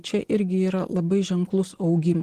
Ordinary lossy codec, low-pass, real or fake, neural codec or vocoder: Opus, 16 kbps; 14.4 kHz; real; none